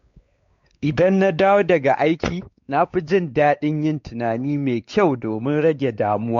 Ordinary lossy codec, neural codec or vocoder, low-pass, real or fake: MP3, 48 kbps; codec, 16 kHz, 4 kbps, X-Codec, WavLM features, trained on Multilingual LibriSpeech; 7.2 kHz; fake